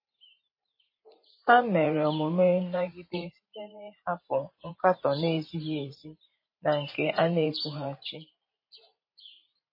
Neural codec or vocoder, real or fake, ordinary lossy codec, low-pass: vocoder, 44.1 kHz, 128 mel bands every 512 samples, BigVGAN v2; fake; MP3, 24 kbps; 5.4 kHz